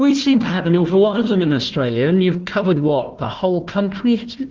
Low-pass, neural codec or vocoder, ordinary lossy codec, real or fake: 7.2 kHz; codec, 16 kHz, 1 kbps, FunCodec, trained on Chinese and English, 50 frames a second; Opus, 16 kbps; fake